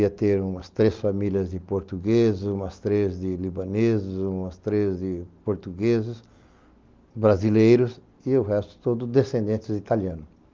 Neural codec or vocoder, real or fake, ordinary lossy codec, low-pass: none; real; Opus, 32 kbps; 7.2 kHz